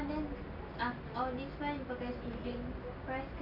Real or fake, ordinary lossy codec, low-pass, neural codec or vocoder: real; none; 5.4 kHz; none